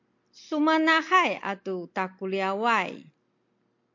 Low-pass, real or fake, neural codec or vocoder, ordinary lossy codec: 7.2 kHz; real; none; MP3, 48 kbps